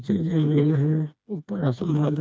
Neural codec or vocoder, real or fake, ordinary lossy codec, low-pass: codec, 16 kHz, 2 kbps, FreqCodec, smaller model; fake; none; none